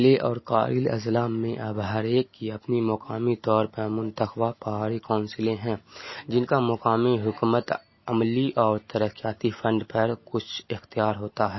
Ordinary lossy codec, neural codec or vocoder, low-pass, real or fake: MP3, 24 kbps; none; 7.2 kHz; real